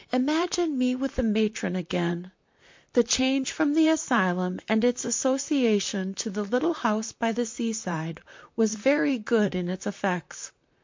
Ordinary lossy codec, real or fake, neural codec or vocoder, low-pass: MP3, 48 kbps; fake; vocoder, 44.1 kHz, 128 mel bands, Pupu-Vocoder; 7.2 kHz